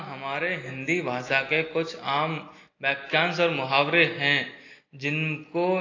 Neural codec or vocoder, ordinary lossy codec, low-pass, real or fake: none; AAC, 32 kbps; 7.2 kHz; real